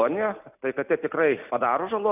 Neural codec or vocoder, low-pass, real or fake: none; 3.6 kHz; real